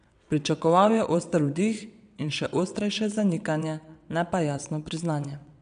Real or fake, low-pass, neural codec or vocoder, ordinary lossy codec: fake; 9.9 kHz; vocoder, 22.05 kHz, 80 mel bands, WaveNeXt; none